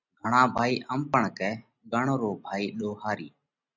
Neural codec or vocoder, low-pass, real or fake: none; 7.2 kHz; real